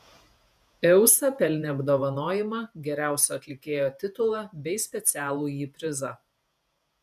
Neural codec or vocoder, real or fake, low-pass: vocoder, 48 kHz, 128 mel bands, Vocos; fake; 14.4 kHz